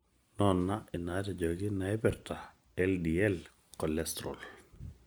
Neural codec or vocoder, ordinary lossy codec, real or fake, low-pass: none; none; real; none